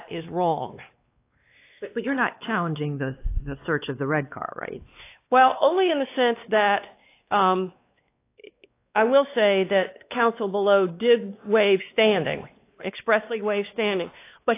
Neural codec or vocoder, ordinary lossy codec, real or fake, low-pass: codec, 16 kHz, 2 kbps, X-Codec, WavLM features, trained on Multilingual LibriSpeech; AAC, 24 kbps; fake; 3.6 kHz